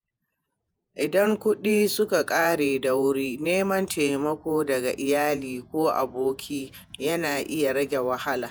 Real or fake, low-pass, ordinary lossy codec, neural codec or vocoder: fake; none; none; vocoder, 48 kHz, 128 mel bands, Vocos